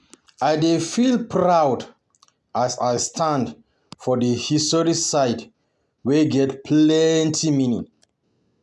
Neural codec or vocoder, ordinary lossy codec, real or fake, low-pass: none; none; real; none